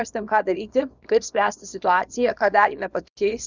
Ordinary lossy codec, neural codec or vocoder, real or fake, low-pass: Opus, 64 kbps; codec, 24 kHz, 0.9 kbps, WavTokenizer, small release; fake; 7.2 kHz